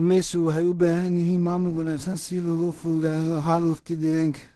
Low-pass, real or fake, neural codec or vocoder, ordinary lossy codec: 10.8 kHz; fake; codec, 16 kHz in and 24 kHz out, 0.4 kbps, LongCat-Audio-Codec, two codebook decoder; Opus, 16 kbps